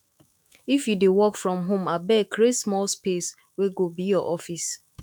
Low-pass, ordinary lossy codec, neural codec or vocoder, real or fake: 19.8 kHz; none; autoencoder, 48 kHz, 128 numbers a frame, DAC-VAE, trained on Japanese speech; fake